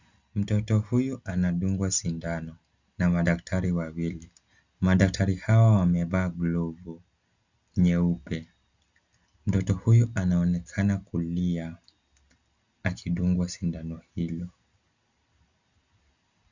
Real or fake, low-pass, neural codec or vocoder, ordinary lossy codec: real; 7.2 kHz; none; Opus, 64 kbps